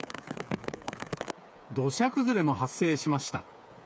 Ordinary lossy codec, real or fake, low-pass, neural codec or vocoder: none; fake; none; codec, 16 kHz, 8 kbps, FreqCodec, smaller model